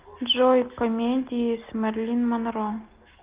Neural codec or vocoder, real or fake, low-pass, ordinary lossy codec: none; real; 3.6 kHz; Opus, 32 kbps